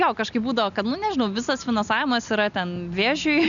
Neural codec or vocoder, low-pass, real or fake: none; 7.2 kHz; real